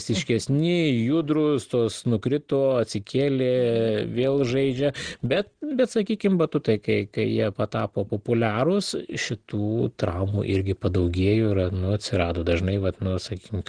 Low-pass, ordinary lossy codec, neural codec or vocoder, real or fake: 9.9 kHz; Opus, 16 kbps; none; real